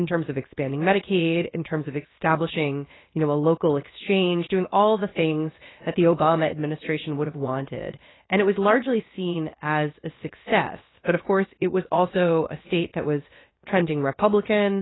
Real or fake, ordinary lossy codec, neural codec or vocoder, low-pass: fake; AAC, 16 kbps; codec, 16 kHz, about 1 kbps, DyCAST, with the encoder's durations; 7.2 kHz